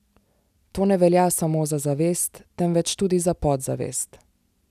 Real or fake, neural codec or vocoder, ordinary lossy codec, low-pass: real; none; none; 14.4 kHz